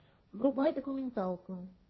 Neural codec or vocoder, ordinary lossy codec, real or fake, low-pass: codec, 16 kHz, 1.1 kbps, Voila-Tokenizer; MP3, 24 kbps; fake; 7.2 kHz